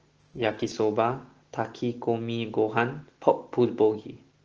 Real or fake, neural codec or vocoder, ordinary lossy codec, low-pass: real; none; Opus, 16 kbps; 7.2 kHz